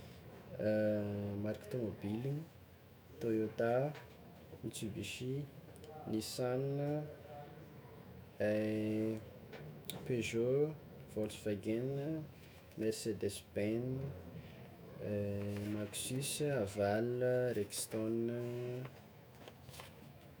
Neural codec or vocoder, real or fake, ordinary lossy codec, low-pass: autoencoder, 48 kHz, 128 numbers a frame, DAC-VAE, trained on Japanese speech; fake; none; none